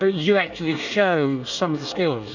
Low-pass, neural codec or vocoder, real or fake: 7.2 kHz; codec, 24 kHz, 1 kbps, SNAC; fake